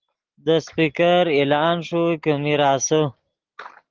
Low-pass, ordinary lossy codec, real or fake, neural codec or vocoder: 7.2 kHz; Opus, 16 kbps; real; none